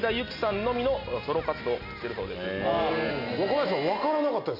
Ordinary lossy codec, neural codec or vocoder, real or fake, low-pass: none; none; real; 5.4 kHz